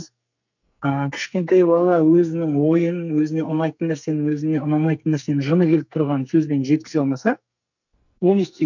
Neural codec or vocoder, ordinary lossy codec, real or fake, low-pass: codec, 44.1 kHz, 2.6 kbps, SNAC; none; fake; 7.2 kHz